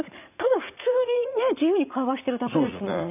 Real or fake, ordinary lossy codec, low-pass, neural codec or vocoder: fake; none; 3.6 kHz; vocoder, 44.1 kHz, 80 mel bands, Vocos